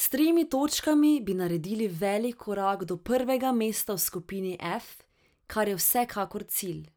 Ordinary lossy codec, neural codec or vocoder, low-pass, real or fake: none; none; none; real